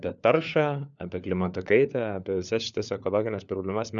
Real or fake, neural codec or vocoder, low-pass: fake; codec, 16 kHz, 4 kbps, FunCodec, trained on LibriTTS, 50 frames a second; 7.2 kHz